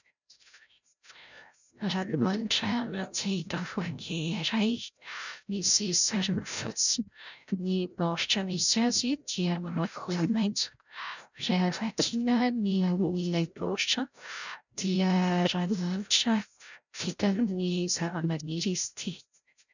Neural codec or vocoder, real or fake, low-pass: codec, 16 kHz, 0.5 kbps, FreqCodec, larger model; fake; 7.2 kHz